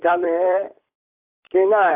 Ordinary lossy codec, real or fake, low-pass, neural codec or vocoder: AAC, 24 kbps; fake; 3.6 kHz; vocoder, 44.1 kHz, 128 mel bands, Pupu-Vocoder